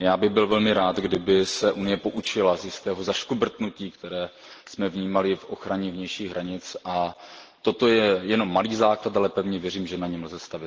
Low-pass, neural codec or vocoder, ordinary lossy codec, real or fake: 7.2 kHz; none; Opus, 16 kbps; real